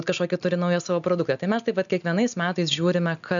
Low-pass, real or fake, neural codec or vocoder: 7.2 kHz; real; none